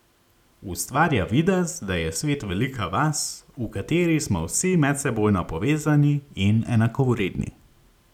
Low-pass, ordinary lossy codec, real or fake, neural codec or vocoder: 19.8 kHz; none; real; none